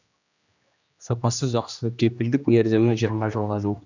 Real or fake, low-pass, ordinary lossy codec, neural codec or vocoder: fake; 7.2 kHz; none; codec, 16 kHz, 1 kbps, X-Codec, HuBERT features, trained on general audio